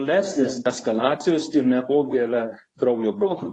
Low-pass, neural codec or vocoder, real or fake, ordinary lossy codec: 10.8 kHz; codec, 24 kHz, 0.9 kbps, WavTokenizer, medium speech release version 2; fake; AAC, 32 kbps